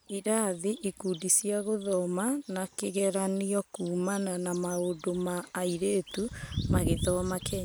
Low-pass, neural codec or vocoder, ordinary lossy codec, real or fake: none; none; none; real